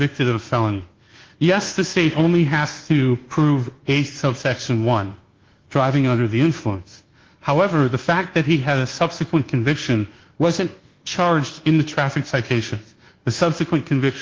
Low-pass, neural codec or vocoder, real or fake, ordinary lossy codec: 7.2 kHz; codec, 24 kHz, 1.2 kbps, DualCodec; fake; Opus, 16 kbps